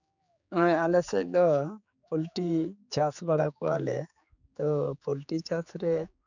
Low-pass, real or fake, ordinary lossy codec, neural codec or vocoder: 7.2 kHz; fake; none; codec, 16 kHz, 4 kbps, X-Codec, HuBERT features, trained on general audio